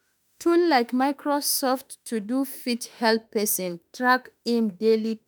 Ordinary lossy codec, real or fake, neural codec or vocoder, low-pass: none; fake; autoencoder, 48 kHz, 32 numbers a frame, DAC-VAE, trained on Japanese speech; none